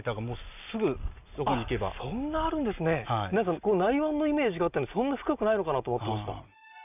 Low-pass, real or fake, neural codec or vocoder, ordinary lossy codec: 3.6 kHz; real; none; none